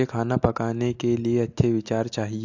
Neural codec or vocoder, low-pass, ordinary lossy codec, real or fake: none; 7.2 kHz; AAC, 48 kbps; real